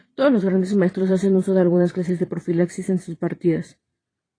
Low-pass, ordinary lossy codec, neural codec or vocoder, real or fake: 9.9 kHz; AAC, 32 kbps; vocoder, 22.05 kHz, 80 mel bands, Vocos; fake